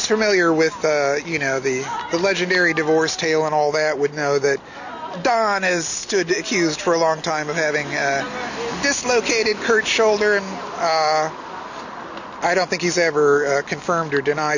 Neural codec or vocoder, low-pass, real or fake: none; 7.2 kHz; real